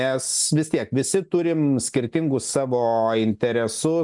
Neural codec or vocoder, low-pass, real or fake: none; 10.8 kHz; real